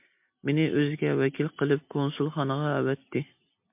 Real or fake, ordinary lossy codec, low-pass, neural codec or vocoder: real; MP3, 32 kbps; 3.6 kHz; none